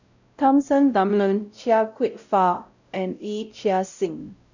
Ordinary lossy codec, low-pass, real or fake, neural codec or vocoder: none; 7.2 kHz; fake; codec, 16 kHz, 0.5 kbps, X-Codec, WavLM features, trained on Multilingual LibriSpeech